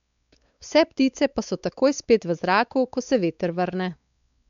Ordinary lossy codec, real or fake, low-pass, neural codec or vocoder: none; fake; 7.2 kHz; codec, 16 kHz, 4 kbps, X-Codec, WavLM features, trained on Multilingual LibriSpeech